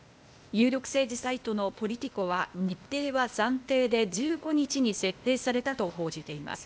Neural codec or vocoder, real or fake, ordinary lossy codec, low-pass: codec, 16 kHz, 0.8 kbps, ZipCodec; fake; none; none